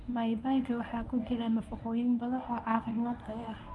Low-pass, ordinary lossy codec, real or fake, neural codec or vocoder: none; none; fake; codec, 24 kHz, 0.9 kbps, WavTokenizer, medium speech release version 1